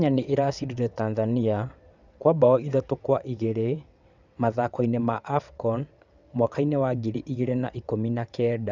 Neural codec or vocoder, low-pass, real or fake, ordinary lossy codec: vocoder, 22.05 kHz, 80 mel bands, WaveNeXt; 7.2 kHz; fake; none